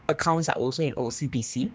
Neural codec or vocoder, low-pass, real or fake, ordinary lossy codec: codec, 16 kHz, 2 kbps, X-Codec, HuBERT features, trained on general audio; none; fake; none